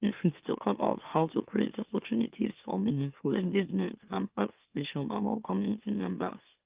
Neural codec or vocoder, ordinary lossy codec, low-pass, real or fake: autoencoder, 44.1 kHz, a latent of 192 numbers a frame, MeloTTS; Opus, 32 kbps; 3.6 kHz; fake